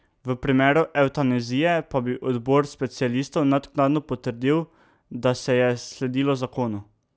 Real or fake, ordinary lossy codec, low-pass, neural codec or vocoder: real; none; none; none